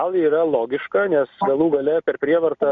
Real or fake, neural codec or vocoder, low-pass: real; none; 7.2 kHz